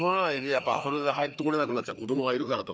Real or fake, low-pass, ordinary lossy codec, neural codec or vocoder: fake; none; none; codec, 16 kHz, 2 kbps, FreqCodec, larger model